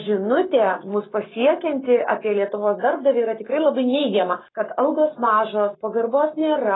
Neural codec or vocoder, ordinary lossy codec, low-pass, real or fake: codec, 44.1 kHz, 7.8 kbps, Pupu-Codec; AAC, 16 kbps; 7.2 kHz; fake